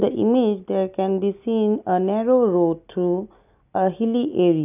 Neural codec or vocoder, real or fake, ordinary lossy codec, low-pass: none; real; none; 3.6 kHz